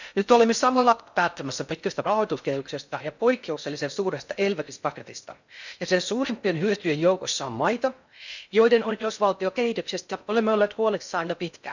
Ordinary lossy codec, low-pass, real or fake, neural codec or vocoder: none; 7.2 kHz; fake; codec, 16 kHz in and 24 kHz out, 0.6 kbps, FocalCodec, streaming, 4096 codes